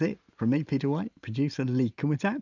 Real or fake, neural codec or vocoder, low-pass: real; none; 7.2 kHz